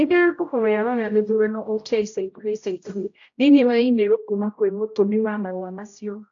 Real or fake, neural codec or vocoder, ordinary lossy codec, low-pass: fake; codec, 16 kHz, 0.5 kbps, X-Codec, HuBERT features, trained on general audio; AAC, 48 kbps; 7.2 kHz